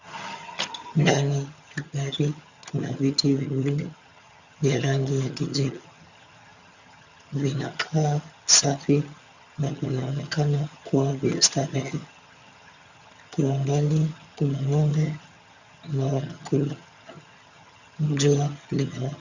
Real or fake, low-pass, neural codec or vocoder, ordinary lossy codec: fake; 7.2 kHz; vocoder, 22.05 kHz, 80 mel bands, HiFi-GAN; Opus, 64 kbps